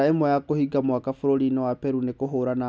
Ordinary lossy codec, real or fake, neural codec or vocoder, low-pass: none; real; none; none